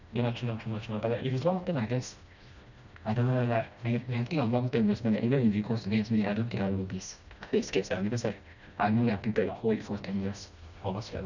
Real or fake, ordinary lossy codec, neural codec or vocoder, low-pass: fake; none; codec, 16 kHz, 1 kbps, FreqCodec, smaller model; 7.2 kHz